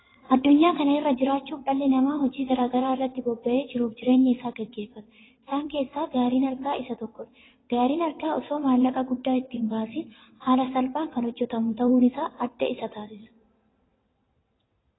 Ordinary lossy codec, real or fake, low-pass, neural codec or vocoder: AAC, 16 kbps; fake; 7.2 kHz; vocoder, 22.05 kHz, 80 mel bands, WaveNeXt